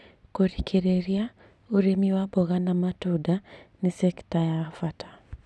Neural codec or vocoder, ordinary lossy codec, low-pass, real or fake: none; none; none; real